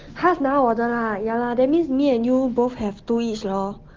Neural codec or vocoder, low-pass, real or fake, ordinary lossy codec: none; 7.2 kHz; real; Opus, 16 kbps